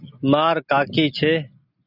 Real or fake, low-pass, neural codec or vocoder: real; 5.4 kHz; none